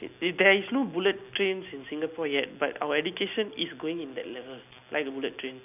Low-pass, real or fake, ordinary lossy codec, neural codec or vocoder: 3.6 kHz; real; none; none